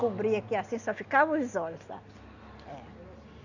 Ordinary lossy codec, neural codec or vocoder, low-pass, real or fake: Opus, 64 kbps; none; 7.2 kHz; real